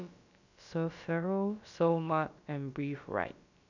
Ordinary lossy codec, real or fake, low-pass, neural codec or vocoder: none; fake; 7.2 kHz; codec, 16 kHz, about 1 kbps, DyCAST, with the encoder's durations